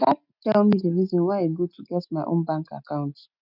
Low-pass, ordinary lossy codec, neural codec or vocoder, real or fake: 5.4 kHz; none; none; real